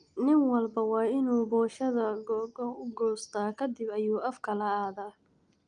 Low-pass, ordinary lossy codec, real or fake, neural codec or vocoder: 9.9 kHz; Opus, 32 kbps; real; none